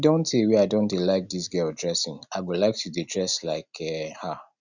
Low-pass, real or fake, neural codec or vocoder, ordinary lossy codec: 7.2 kHz; real; none; none